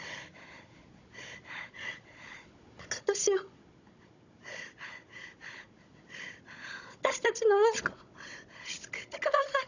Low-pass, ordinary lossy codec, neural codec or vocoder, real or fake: 7.2 kHz; none; codec, 16 kHz, 16 kbps, FunCodec, trained on Chinese and English, 50 frames a second; fake